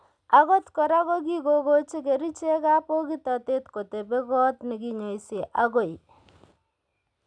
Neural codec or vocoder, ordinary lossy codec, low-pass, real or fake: none; none; 9.9 kHz; real